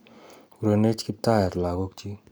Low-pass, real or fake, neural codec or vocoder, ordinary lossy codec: none; real; none; none